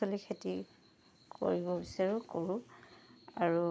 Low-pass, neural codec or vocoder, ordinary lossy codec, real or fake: none; none; none; real